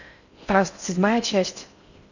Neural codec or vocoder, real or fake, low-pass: codec, 16 kHz in and 24 kHz out, 0.6 kbps, FocalCodec, streaming, 4096 codes; fake; 7.2 kHz